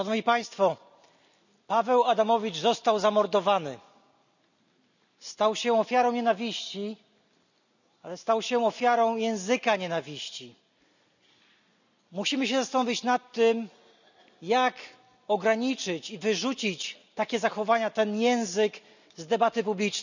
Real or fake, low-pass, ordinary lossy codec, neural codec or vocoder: real; 7.2 kHz; none; none